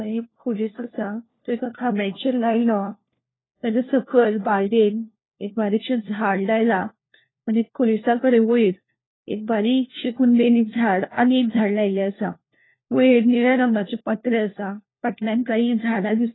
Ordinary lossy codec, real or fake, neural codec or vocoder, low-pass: AAC, 16 kbps; fake; codec, 16 kHz, 1 kbps, FunCodec, trained on LibriTTS, 50 frames a second; 7.2 kHz